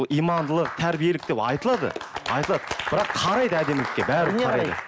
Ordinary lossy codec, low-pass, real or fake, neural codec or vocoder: none; none; real; none